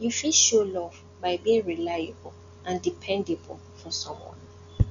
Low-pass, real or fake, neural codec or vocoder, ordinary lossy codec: 7.2 kHz; real; none; none